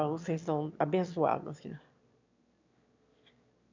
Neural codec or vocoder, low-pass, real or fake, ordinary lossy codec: autoencoder, 22.05 kHz, a latent of 192 numbers a frame, VITS, trained on one speaker; 7.2 kHz; fake; MP3, 64 kbps